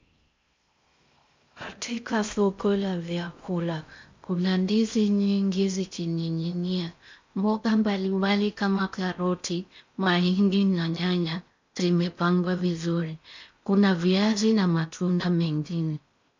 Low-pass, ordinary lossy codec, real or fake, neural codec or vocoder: 7.2 kHz; MP3, 64 kbps; fake; codec, 16 kHz in and 24 kHz out, 0.6 kbps, FocalCodec, streaming, 2048 codes